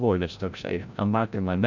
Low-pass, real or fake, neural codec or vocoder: 7.2 kHz; fake; codec, 16 kHz, 0.5 kbps, FreqCodec, larger model